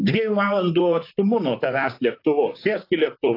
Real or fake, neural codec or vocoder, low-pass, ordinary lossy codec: fake; vocoder, 44.1 kHz, 128 mel bands, Pupu-Vocoder; 5.4 kHz; AAC, 32 kbps